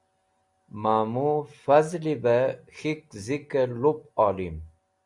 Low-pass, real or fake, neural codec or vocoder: 10.8 kHz; real; none